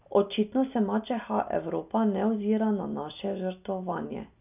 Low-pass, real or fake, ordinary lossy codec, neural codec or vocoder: 3.6 kHz; real; none; none